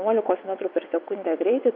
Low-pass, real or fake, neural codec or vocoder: 5.4 kHz; fake; vocoder, 22.05 kHz, 80 mel bands, WaveNeXt